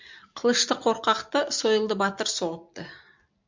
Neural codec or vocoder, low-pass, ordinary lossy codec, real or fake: none; 7.2 kHz; MP3, 64 kbps; real